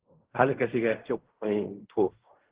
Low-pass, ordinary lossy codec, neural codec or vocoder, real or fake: 3.6 kHz; Opus, 16 kbps; codec, 16 kHz in and 24 kHz out, 0.4 kbps, LongCat-Audio-Codec, fine tuned four codebook decoder; fake